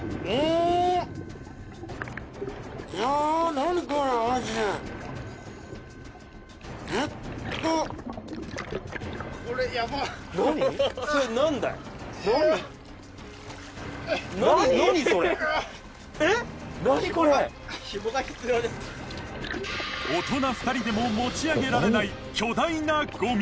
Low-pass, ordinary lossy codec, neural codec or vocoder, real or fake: none; none; none; real